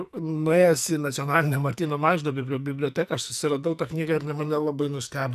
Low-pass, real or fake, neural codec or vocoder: 14.4 kHz; fake; codec, 44.1 kHz, 2.6 kbps, SNAC